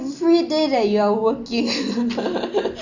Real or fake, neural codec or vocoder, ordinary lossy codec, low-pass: real; none; none; 7.2 kHz